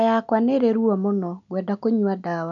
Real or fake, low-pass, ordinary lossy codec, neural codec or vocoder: real; 7.2 kHz; none; none